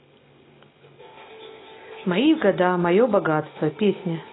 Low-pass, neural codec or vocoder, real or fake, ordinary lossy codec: 7.2 kHz; none; real; AAC, 16 kbps